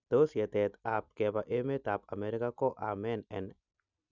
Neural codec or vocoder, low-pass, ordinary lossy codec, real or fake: none; 7.2 kHz; none; real